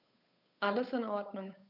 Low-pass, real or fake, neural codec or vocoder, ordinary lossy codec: 5.4 kHz; fake; codec, 16 kHz, 8 kbps, FunCodec, trained on Chinese and English, 25 frames a second; none